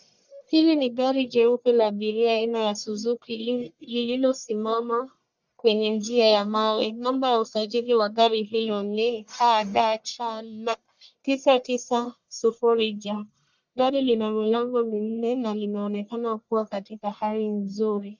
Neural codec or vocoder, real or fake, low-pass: codec, 44.1 kHz, 1.7 kbps, Pupu-Codec; fake; 7.2 kHz